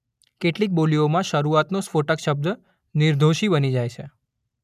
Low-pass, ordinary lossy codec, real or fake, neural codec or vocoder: 14.4 kHz; none; real; none